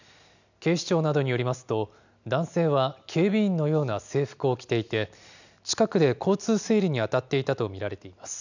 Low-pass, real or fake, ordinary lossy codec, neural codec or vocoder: 7.2 kHz; real; none; none